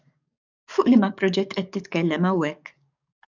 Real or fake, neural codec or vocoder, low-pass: fake; codec, 44.1 kHz, 7.8 kbps, DAC; 7.2 kHz